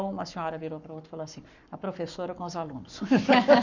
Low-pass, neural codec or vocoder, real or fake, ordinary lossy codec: 7.2 kHz; codec, 44.1 kHz, 7.8 kbps, Pupu-Codec; fake; none